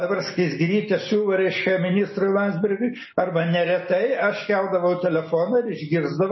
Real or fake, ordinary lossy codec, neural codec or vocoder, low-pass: real; MP3, 24 kbps; none; 7.2 kHz